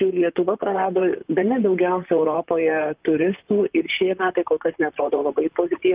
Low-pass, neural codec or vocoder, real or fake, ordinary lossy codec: 3.6 kHz; vocoder, 44.1 kHz, 128 mel bands, Pupu-Vocoder; fake; Opus, 24 kbps